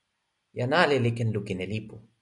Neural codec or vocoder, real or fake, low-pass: none; real; 10.8 kHz